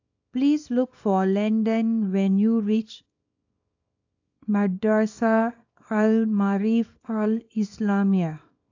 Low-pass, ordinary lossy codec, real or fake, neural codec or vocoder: 7.2 kHz; AAC, 48 kbps; fake; codec, 24 kHz, 0.9 kbps, WavTokenizer, small release